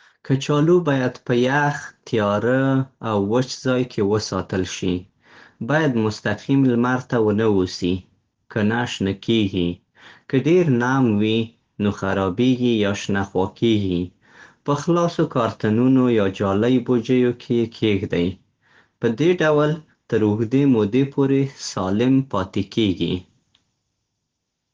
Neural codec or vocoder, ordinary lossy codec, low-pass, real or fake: none; Opus, 16 kbps; 7.2 kHz; real